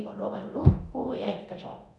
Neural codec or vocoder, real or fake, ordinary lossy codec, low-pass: codec, 24 kHz, 0.9 kbps, DualCodec; fake; none; 10.8 kHz